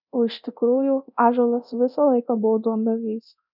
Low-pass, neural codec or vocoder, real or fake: 5.4 kHz; codec, 24 kHz, 0.9 kbps, DualCodec; fake